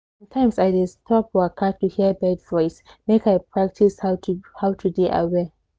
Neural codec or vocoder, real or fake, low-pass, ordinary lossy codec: none; real; none; none